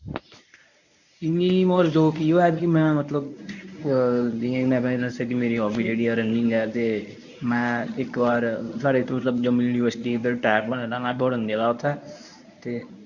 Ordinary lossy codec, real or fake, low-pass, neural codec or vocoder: none; fake; 7.2 kHz; codec, 24 kHz, 0.9 kbps, WavTokenizer, medium speech release version 1